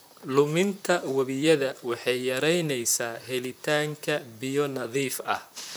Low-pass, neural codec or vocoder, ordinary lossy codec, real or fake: none; vocoder, 44.1 kHz, 128 mel bands, Pupu-Vocoder; none; fake